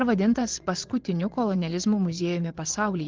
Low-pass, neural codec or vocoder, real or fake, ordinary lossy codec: 7.2 kHz; vocoder, 24 kHz, 100 mel bands, Vocos; fake; Opus, 16 kbps